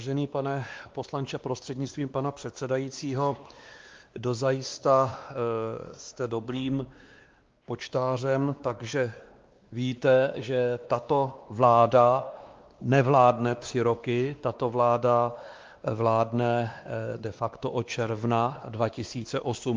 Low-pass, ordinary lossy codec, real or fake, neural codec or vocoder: 7.2 kHz; Opus, 32 kbps; fake; codec, 16 kHz, 2 kbps, X-Codec, WavLM features, trained on Multilingual LibriSpeech